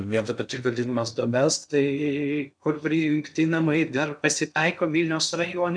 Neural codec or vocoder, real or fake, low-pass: codec, 16 kHz in and 24 kHz out, 0.6 kbps, FocalCodec, streaming, 2048 codes; fake; 9.9 kHz